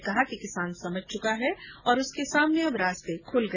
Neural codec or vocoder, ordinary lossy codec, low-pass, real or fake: none; none; none; real